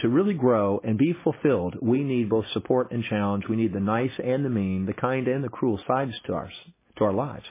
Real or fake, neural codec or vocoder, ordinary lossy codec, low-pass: real; none; MP3, 16 kbps; 3.6 kHz